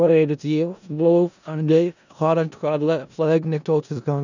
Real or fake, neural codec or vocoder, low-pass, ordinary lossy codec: fake; codec, 16 kHz in and 24 kHz out, 0.4 kbps, LongCat-Audio-Codec, four codebook decoder; 7.2 kHz; none